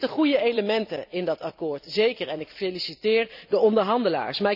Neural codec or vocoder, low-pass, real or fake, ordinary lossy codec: vocoder, 44.1 kHz, 128 mel bands every 256 samples, BigVGAN v2; 5.4 kHz; fake; none